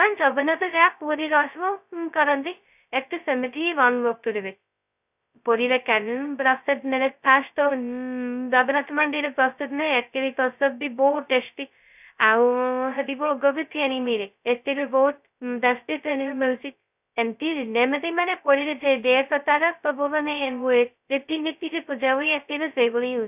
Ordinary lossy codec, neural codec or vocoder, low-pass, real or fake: none; codec, 16 kHz, 0.2 kbps, FocalCodec; 3.6 kHz; fake